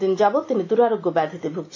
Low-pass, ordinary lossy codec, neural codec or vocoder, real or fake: 7.2 kHz; AAC, 32 kbps; none; real